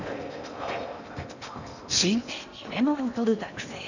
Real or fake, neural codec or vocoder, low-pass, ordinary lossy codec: fake; codec, 16 kHz in and 24 kHz out, 0.8 kbps, FocalCodec, streaming, 65536 codes; 7.2 kHz; none